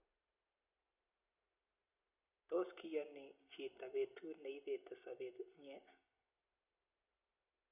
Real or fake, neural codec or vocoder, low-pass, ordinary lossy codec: real; none; 3.6 kHz; none